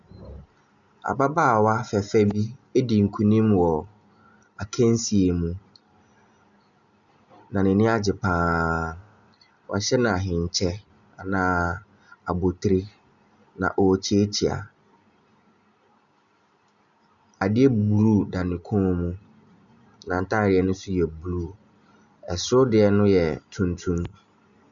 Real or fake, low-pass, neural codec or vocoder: real; 7.2 kHz; none